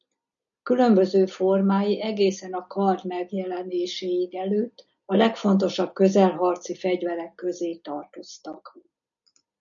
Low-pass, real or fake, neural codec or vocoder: 7.2 kHz; real; none